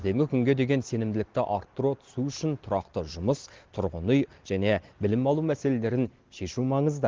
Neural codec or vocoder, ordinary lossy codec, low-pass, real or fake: none; Opus, 16 kbps; 7.2 kHz; real